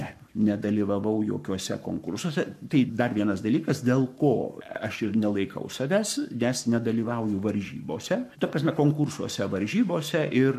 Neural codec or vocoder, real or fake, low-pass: codec, 44.1 kHz, 7.8 kbps, Pupu-Codec; fake; 14.4 kHz